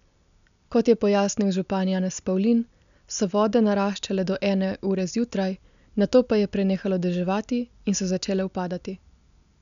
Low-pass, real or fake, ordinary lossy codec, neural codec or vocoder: 7.2 kHz; real; none; none